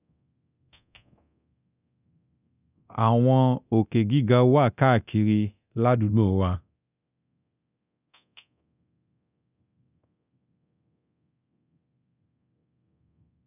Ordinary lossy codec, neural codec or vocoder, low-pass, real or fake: none; codec, 24 kHz, 0.9 kbps, DualCodec; 3.6 kHz; fake